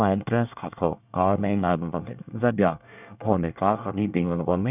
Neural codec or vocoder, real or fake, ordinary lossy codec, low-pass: codec, 24 kHz, 1 kbps, SNAC; fake; none; 3.6 kHz